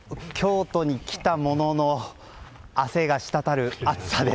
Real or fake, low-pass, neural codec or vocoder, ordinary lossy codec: real; none; none; none